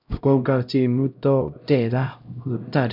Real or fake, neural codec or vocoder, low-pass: fake; codec, 16 kHz, 0.5 kbps, X-Codec, HuBERT features, trained on LibriSpeech; 5.4 kHz